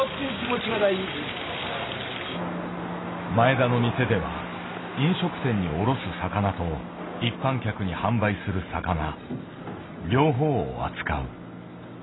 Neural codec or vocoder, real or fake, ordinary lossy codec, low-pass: none; real; AAC, 16 kbps; 7.2 kHz